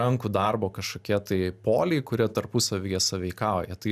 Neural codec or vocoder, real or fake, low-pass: none; real; 14.4 kHz